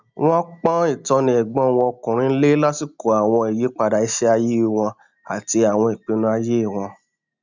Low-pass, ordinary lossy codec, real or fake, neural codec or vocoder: 7.2 kHz; none; real; none